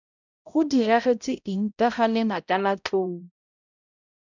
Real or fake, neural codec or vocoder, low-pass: fake; codec, 16 kHz, 0.5 kbps, X-Codec, HuBERT features, trained on balanced general audio; 7.2 kHz